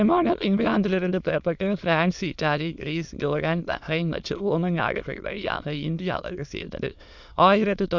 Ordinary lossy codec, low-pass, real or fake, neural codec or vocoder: none; 7.2 kHz; fake; autoencoder, 22.05 kHz, a latent of 192 numbers a frame, VITS, trained on many speakers